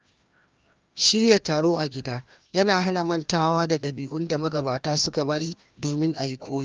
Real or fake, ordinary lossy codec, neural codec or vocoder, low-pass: fake; Opus, 32 kbps; codec, 16 kHz, 1 kbps, FreqCodec, larger model; 7.2 kHz